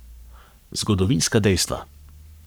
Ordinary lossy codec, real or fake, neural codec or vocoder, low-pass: none; fake; codec, 44.1 kHz, 7.8 kbps, Pupu-Codec; none